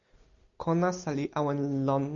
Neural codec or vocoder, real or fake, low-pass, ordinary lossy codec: none; real; 7.2 kHz; MP3, 96 kbps